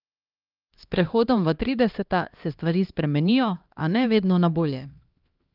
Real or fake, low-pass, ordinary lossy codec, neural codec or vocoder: fake; 5.4 kHz; Opus, 24 kbps; codec, 16 kHz, 1 kbps, X-Codec, HuBERT features, trained on LibriSpeech